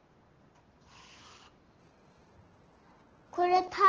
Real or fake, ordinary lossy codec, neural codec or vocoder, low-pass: real; Opus, 16 kbps; none; 7.2 kHz